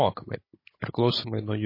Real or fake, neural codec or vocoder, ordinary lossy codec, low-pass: fake; codec, 16 kHz, 16 kbps, FunCodec, trained on Chinese and English, 50 frames a second; MP3, 24 kbps; 5.4 kHz